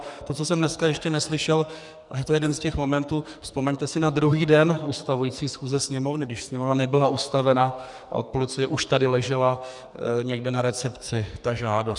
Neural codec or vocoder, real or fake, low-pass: codec, 44.1 kHz, 2.6 kbps, SNAC; fake; 10.8 kHz